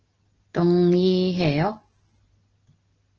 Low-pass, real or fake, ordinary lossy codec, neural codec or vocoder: 7.2 kHz; real; Opus, 16 kbps; none